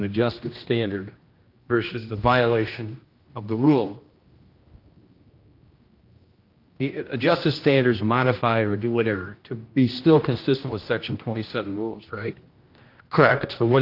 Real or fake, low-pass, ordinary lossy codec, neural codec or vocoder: fake; 5.4 kHz; Opus, 24 kbps; codec, 16 kHz, 1 kbps, X-Codec, HuBERT features, trained on general audio